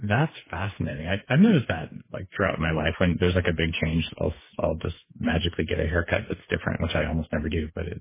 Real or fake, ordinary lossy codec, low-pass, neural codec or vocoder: fake; MP3, 16 kbps; 3.6 kHz; codec, 16 kHz, 4 kbps, FreqCodec, smaller model